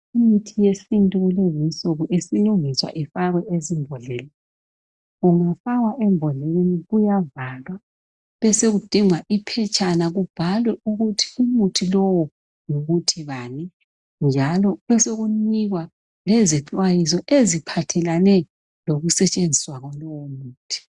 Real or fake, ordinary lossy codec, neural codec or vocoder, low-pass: real; Opus, 64 kbps; none; 10.8 kHz